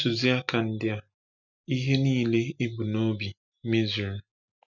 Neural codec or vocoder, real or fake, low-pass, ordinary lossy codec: none; real; 7.2 kHz; none